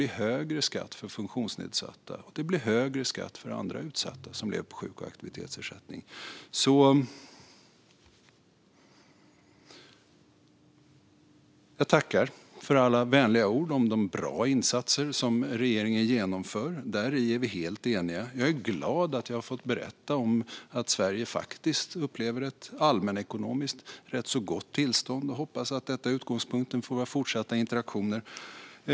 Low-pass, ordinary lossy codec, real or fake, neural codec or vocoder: none; none; real; none